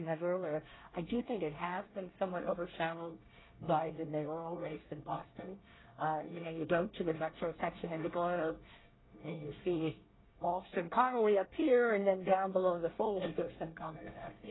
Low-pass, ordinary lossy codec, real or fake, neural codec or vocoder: 7.2 kHz; AAC, 16 kbps; fake; codec, 24 kHz, 1 kbps, SNAC